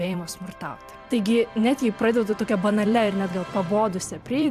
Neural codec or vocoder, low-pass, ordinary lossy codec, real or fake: vocoder, 44.1 kHz, 128 mel bands every 256 samples, BigVGAN v2; 14.4 kHz; AAC, 96 kbps; fake